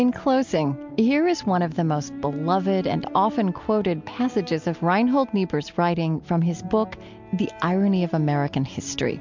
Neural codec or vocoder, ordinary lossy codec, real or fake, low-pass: none; MP3, 64 kbps; real; 7.2 kHz